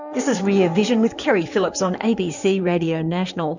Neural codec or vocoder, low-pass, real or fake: codec, 16 kHz in and 24 kHz out, 2.2 kbps, FireRedTTS-2 codec; 7.2 kHz; fake